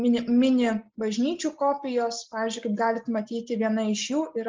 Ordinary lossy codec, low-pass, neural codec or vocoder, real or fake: Opus, 24 kbps; 7.2 kHz; none; real